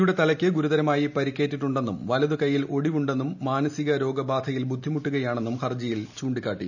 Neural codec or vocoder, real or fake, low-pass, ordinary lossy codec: none; real; 7.2 kHz; none